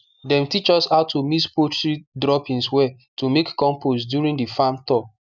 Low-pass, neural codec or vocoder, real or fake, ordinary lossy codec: 7.2 kHz; none; real; none